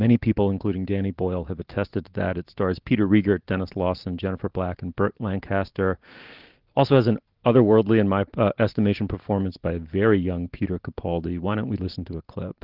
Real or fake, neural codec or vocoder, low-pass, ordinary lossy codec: real; none; 5.4 kHz; Opus, 16 kbps